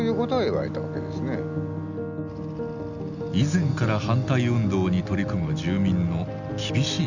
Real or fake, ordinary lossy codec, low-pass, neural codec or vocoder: real; none; 7.2 kHz; none